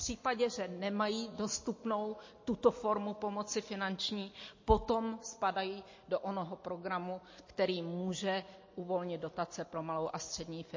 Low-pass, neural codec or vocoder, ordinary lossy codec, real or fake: 7.2 kHz; none; MP3, 32 kbps; real